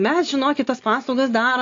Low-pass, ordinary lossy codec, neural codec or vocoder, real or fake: 7.2 kHz; AAC, 48 kbps; none; real